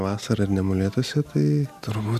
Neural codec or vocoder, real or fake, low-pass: none; real; 14.4 kHz